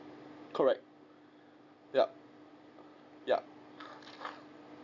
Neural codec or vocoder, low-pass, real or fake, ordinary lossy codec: none; 7.2 kHz; real; none